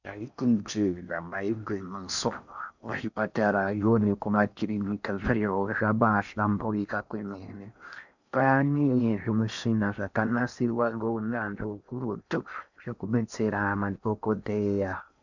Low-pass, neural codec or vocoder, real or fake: 7.2 kHz; codec, 16 kHz in and 24 kHz out, 0.6 kbps, FocalCodec, streaming, 4096 codes; fake